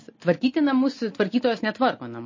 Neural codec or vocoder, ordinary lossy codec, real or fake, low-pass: none; MP3, 32 kbps; real; 7.2 kHz